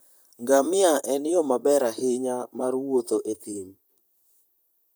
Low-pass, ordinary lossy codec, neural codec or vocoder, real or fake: none; none; vocoder, 44.1 kHz, 128 mel bands, Pupu-Vocoder; fake